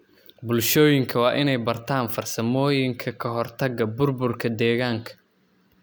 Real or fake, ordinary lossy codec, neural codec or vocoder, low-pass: real; none; none; none